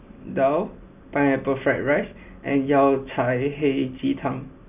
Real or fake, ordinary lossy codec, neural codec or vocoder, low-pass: real; none; none; 3.6 kHz